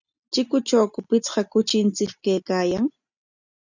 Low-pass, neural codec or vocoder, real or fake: 7.2 kHz; none; real